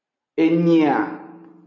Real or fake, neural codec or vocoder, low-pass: real; none; 7.2 kHz